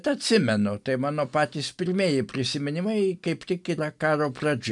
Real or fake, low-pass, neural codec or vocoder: real; 10.8 kHz; none